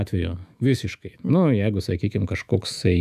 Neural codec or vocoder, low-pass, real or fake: autoencoder, 48 kHz, 128 numbers a frame, DAC-VAE, trained on Japanese speech; 14.4 kHz; fake